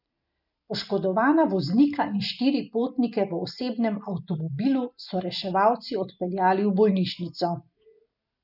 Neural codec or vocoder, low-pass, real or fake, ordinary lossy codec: none; 5.4 kHz; real; none